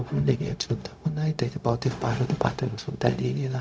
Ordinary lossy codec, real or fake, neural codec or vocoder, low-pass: none; fake; codec, 16 kHz, 0.4 kbps, LongCat-Audio-Codec; none